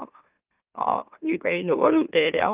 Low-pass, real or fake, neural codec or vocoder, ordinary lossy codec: 3.6 kHz; fake; autoencoder, 44.1 kHz, a latent of 192 numbers a frame, MeloTTS; Opus, 24 kbps